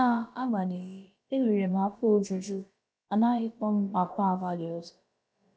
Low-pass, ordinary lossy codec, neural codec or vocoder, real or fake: none; none; codec, 16 kHz, about 1 kbps, DyCAST, with the encoder's durations; fake